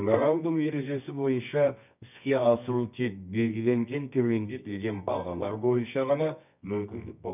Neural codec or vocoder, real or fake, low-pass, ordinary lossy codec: codec, 24 kHz, 0.9 kbps, WavTokenizer, medium music audio release; fake; 3.6 kHz; none